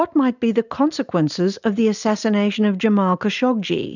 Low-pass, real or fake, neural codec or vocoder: 7.2 kHz; real; none